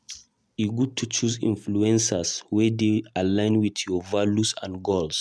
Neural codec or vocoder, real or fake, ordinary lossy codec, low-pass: none; real; none; none